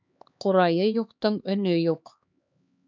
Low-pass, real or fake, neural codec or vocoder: 7.2 kHz; fake; codec, 16 kHz, 2 kbps, X-Codec, HuBERT features, trained on LibriSpeech